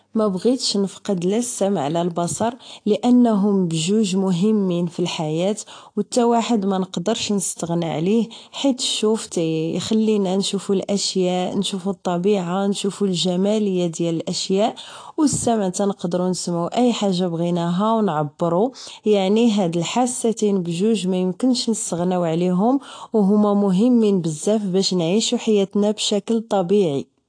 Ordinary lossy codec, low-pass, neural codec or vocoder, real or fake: AAC, 48 kbps; 9.9 kHz; none; real